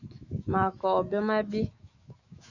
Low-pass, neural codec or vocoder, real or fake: 7.2 kHz; autoencoder, 48 kHz, 128 numbers a frame, DAC-VAE, trained on Japanese speech; fake